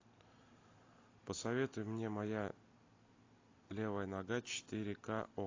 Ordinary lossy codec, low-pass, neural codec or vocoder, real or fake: AAC, 32 kbps; 7.2 kHz; none; real